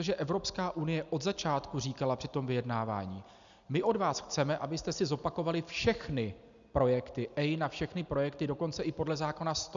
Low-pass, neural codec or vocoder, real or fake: 7.2 kHz; none; real